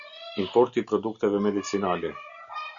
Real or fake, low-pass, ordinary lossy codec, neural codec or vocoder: real; 7.2 kHz; Opus, 64 kbps; none